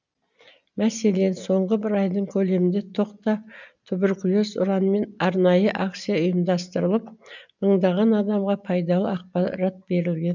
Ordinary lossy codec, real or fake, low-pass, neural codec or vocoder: none; real; 7.2 kHz; none